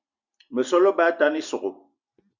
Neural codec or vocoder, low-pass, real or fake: none; 7.2 kHz; real